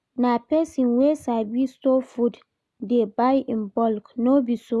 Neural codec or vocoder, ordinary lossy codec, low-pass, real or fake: none; none; none; real